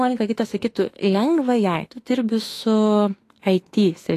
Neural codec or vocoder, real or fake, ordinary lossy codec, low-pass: autoencoder, 48 kHz, 32 numbers a frame, DAC-VAE, trained on Japanese speech; fake; AAC, 48 kbps; 14.4 kHz